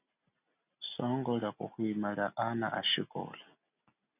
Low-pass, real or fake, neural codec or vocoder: 3.6 kHz; real; none